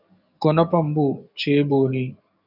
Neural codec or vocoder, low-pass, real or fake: codec, 44.1 kHz, 7.8 kbps, DAC; 5.4 kHz; fake